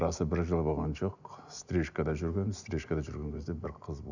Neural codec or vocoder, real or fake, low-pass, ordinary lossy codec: none; real; 7.2 kHz; none